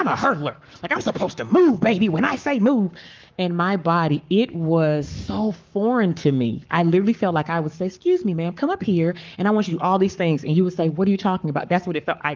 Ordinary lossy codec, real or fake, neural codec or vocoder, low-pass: Opus, 24 kbps; fake; codec, 44.1 kHz, 7.8 kbps, Pupu-Codec; 7.2 kHz